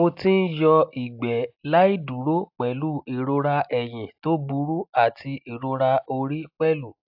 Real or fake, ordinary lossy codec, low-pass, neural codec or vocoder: real; AAC, 48 kbps; 5.4 kHz; none